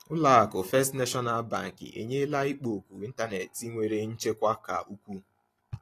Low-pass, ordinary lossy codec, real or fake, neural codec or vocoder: 14.4 kHz; AAC, 48 kbps; real; none